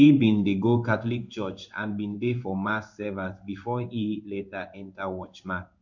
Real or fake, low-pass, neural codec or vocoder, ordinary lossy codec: fake; 7.2 kHz; codec, 16 kHz in and 24 kHz out, 1 kbps, XY-Tokenizer; none